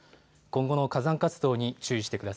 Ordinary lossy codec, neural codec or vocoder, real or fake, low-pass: none; none; real; none